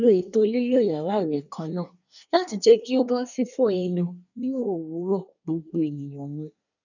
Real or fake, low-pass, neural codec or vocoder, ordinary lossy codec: fake; 7.2 kHz; codec, 24 kHz, 1 kbps, SNAC; none